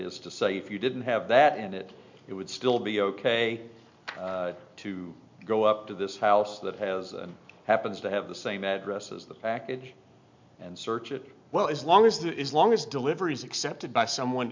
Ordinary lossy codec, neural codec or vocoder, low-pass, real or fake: MP3, 64 kbps; none; 7.2 kHz; real